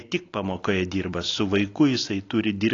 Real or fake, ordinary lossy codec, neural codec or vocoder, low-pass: real; AAC, 32 kbps; none; 7.2 kHz